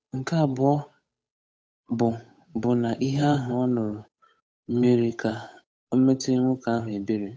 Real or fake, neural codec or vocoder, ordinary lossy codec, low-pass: fake; codec, 16 kHz, 8 kbps, FunCodec, trained on Chinese and English, 25 frames a second; none; none